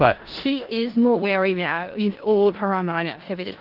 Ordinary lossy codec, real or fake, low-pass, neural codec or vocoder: Opus, 16 kbps; fake; 5.4 kHz; codec, 16 kHz in and 24 kHz out, 0.4 kbps, LongCat-Audio-Codec, four codebook decoder